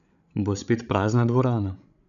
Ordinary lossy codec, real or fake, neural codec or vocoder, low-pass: none; fake; codec, 16 kHz, 16 kbps, FreqCodec, larger model; 7.2 kHz